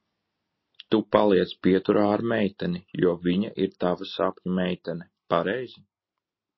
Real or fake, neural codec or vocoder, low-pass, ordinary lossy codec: real; none; 7.2 kHz; MP3, 24 kbps